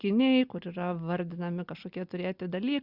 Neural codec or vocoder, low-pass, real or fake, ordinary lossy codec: none; 5.4 kHz; real; Opus, 64 kbps